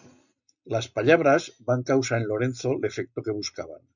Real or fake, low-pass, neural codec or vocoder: real; 7.2 kHz; none